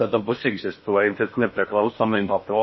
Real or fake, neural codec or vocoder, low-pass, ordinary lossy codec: fake; codec, 16 kHz in and 24 kHz out, 0.6 kbps, FocalCodec, streaming, 4096 codes; 7.2 kHz; MP3, 24 kbps